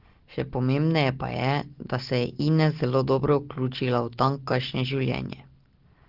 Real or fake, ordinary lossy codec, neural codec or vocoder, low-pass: real; Opus, 16 kbps; none; 5.4 kHz